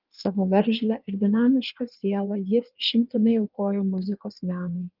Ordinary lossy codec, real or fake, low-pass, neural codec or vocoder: Opus, 24 kbps; fake; 5.4 kHz; codec, 16 kHz in and 24 kHz out, 1.1 kbps, FireRedTTS-2 codec